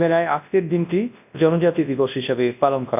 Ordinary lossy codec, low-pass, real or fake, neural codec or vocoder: none; 3.6 kHz; fake; codec, 24 kHz, 0.9 kbps, WavTokenizer, large speech release